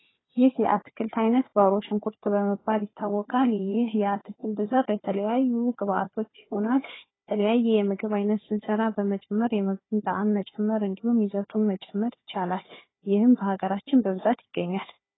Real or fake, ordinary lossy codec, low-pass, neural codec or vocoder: fake; AAC, 16 kbps; 7.2 kHz; codec, 16 kHz, 4 kbps, FunCodec, trained on Chinese and English, 50 frames a second